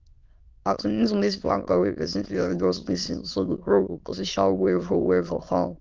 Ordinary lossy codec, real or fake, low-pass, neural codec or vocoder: Opus, 32 kbps; fake; 7.2 kHz; autoencoder, 22.05 kHz, a latent of 192 numbers a frame, VITS, trained on many speakers